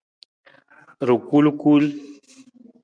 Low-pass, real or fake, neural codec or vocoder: 9.9 kHz; real; none